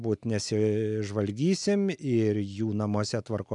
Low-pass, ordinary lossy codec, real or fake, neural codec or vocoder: 10.8 kHz; MP3, 96 kbps; real; none